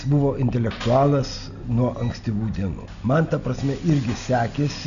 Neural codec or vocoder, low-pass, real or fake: none; 7.2 kHz; real